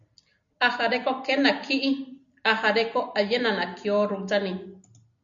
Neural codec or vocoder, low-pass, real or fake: none; 7.2 kHz; real